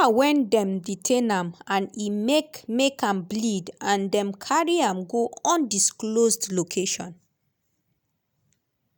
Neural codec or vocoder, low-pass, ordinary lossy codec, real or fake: none; none; none; real